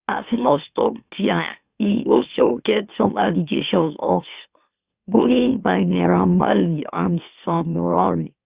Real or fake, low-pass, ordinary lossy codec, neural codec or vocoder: fake; 3.6 kHz; Opus, 64 kbps; autoencoder, 44.1 kHz, a latent of 192 numbers a frame, MeloTTS